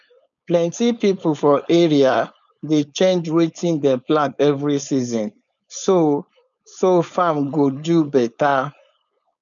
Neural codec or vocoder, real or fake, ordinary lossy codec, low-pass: codec, 16 kHz, 4.8 kbps, FACodec; fake; none; 7.2 kHz